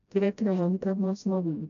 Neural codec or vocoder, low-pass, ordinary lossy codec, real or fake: codec, 16 kHz, 0.5 kbps, FreqCodec, smaller model; 7.2 kHz; none; fake